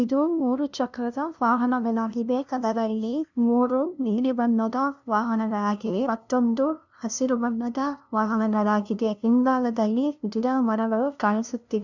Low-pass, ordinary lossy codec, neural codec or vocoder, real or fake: 7.2 kHz; none; codec, 16 kHz, 0.5 kbps, FunCodec, trained on LibriTTS, 25 frames a second; fake